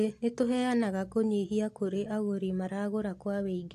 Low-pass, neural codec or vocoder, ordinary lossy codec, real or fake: 14.4 kHz; none; AAC, 64 kbps; real